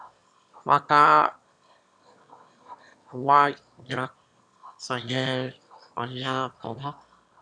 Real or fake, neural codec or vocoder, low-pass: fake; autoencoder, 22.05 kHz, a latent of 192 numbers a frame, VITS, trained on one speaker; 9.9 kHz